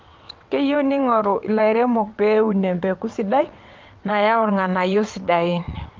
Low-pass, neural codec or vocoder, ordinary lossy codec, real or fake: 7.2 kHz; vocoder, 22.05 kHz, 80 mel bands, WaveNeXt; Opus, 32 kbps; fake